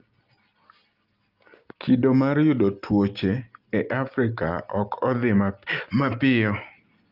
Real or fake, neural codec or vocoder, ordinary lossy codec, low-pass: real; none; Opus, 24 kbps; 5.4 kHz